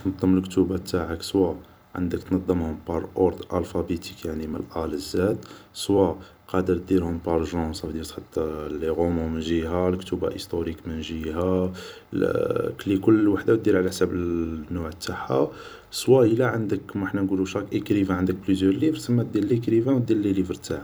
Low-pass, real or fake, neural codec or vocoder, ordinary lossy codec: none; fake; vocoder, 44.1 kHz, 128 mel bands every 256 samples, BigVGAN v2; none